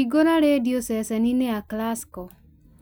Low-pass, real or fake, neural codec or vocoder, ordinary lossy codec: none; real; none; none